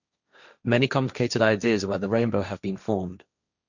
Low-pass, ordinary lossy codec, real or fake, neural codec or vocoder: 7.2 kHz; none; fake; codec, 16 kHz, 1.1 kbps, Voila-Tokenizer